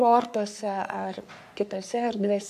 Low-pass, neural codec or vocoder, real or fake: 14.4 kHz; codec, 44.1 kHz, 3.4 kbps, Pupu-Codec; fake